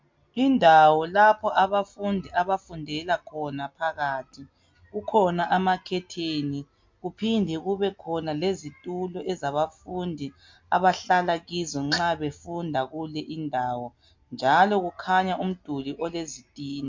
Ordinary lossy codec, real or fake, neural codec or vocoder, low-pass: MP3, 48 kbps; real; none; 7.2 kHz